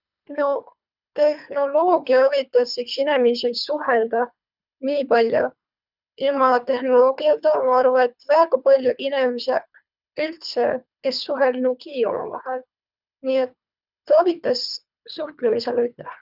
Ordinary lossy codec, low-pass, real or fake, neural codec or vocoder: none; 5.4 kHz; fake; codec, 24 kHz, 3 kbps, HILCodec